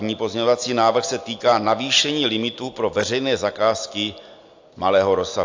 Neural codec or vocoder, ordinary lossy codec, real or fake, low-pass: none; AAC, 48 kbps; real; 7.2 kHz